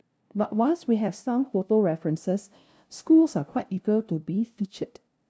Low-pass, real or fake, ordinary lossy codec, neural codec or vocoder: none; fake; none; codec, 16 kHz, 0.5 kbps, FunCodec, trained on LibriTTS, 25 frames a second